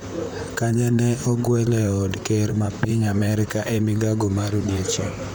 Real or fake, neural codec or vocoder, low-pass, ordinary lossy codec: fake; vocoder, 44.1 kHz, 128 mel bands, Pupu-Vocoder; none; none